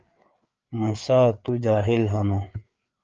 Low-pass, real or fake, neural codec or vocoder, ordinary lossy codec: 7.2 kHz; fake; codec, 16 kHz, 4 kbps, FreqCodec, larger model; Opus, 24 kbps